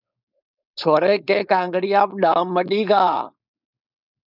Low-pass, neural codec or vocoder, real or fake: 5.4 kHz; codec, 16 kHz, 4.8 kbps, FACodec; fake